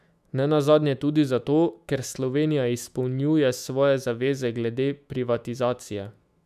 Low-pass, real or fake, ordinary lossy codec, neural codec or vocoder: 14.4 kHz; fake; none; autoencoder, 48 kHz, 128 numbers a frame, DAC-VAE, trained on Japanese speech